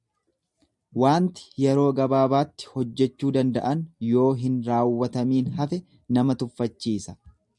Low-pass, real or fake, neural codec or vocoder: 10.8 kHz; real; none